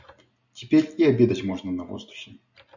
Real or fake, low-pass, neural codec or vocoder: real; 7.2 kHz; none